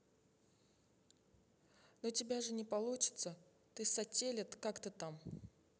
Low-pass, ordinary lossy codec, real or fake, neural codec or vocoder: none; none; real; none